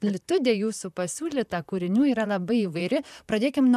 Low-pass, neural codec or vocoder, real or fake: 14.4 kHz; vocoder, 44.1 kHz, 128 mel bands, Pupu-Vocoder; fake